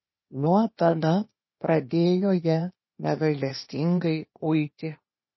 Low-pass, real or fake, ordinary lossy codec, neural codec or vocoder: 7.2 kHz; fake; MP3, 24 kbps; codec, 16 kHz, 0.8 kbps, ZipCodec